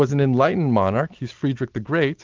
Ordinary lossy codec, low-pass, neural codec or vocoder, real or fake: Opus, 32 kbps; 7.2 kHz; none; real